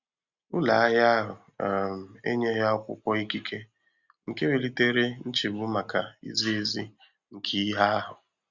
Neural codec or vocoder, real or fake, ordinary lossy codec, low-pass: none; real; Opus, 64 kbps; 7.2 kHz